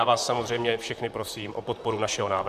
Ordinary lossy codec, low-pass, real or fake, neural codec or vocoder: MP3, 96 kbps; 14.4 kHz; fake; vocoder, 44.1 kHz, 128 mel bands, Pupu-Vocoder